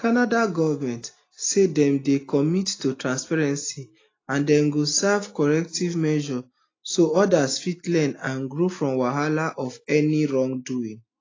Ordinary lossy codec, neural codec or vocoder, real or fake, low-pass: AAC, 32 kbps; none; real; 7.2 kHz